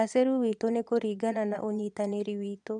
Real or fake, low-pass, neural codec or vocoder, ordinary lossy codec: fake; 9.9 kHz; vocoder, 22.05 kHz, 80 mel bands, Vocos; none